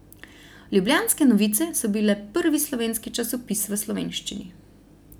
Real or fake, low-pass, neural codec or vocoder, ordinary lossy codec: real; none; none; none